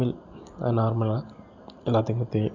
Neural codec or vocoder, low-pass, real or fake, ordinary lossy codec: none; 7.2 kHz; real; none